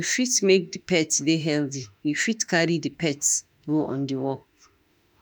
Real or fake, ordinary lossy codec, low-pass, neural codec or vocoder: fake; none; none; autoencoder, 48 kHz, 32 numbers a frame, DAC-VAE, trained on Japanese speech